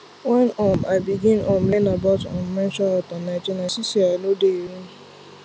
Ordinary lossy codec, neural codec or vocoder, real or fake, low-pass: none; none; real; none